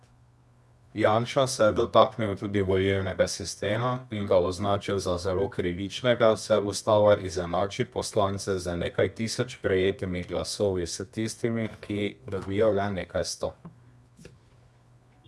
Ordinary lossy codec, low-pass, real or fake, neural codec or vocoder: none; none; fake; codec, 24 kHz, 0.9 kbps, WavTokenizer, medium music audio release